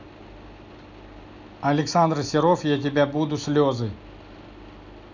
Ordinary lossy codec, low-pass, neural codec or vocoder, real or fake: none; 7.2 kHz; none; real